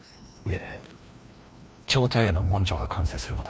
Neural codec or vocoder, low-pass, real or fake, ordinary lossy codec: codec, 16 kHz, 1 kbps, FreqCodec, larger model; none; fake; none